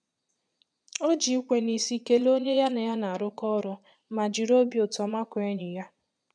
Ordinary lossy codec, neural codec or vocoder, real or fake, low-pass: none; vocoder, 22.05 kHz, 80 mel bands, Vocos; fake; 9.9 kHz